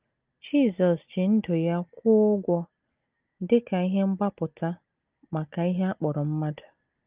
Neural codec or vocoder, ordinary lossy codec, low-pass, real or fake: none; Opus, 32 kbps; 3.6 kHz; real